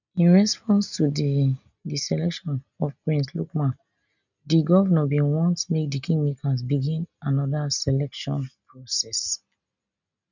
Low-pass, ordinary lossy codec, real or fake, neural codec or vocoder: 7.2 kHz; none; real; none